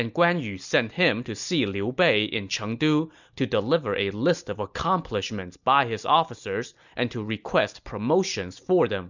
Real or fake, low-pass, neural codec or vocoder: real; 7.2 kHz; none